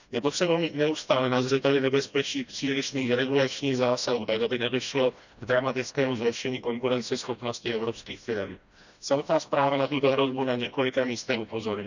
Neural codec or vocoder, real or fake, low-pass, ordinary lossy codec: codec, 16 kHz, 1 kbps, FreqCodec, smaller model; fake; 7.2 kHz; none